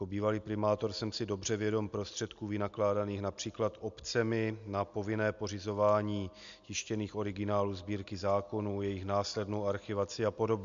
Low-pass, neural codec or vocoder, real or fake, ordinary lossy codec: 7.2 kHz; none; real; MP3, 64 kbps